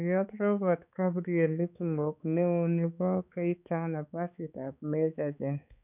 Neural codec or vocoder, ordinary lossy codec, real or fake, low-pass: codec, 16 kHz, 4 kbps, X-Codec, HuBERT features, trained on balanced general audio; none; fake; 3.6 kHz